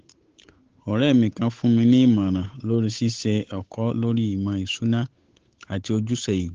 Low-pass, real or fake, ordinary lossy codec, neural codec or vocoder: 7.2 kHz; fake; Opus, 16 kbps; codec, 16 kHz, 8 kbps, FunCodec, trained on Chinese and English, 25 frames a second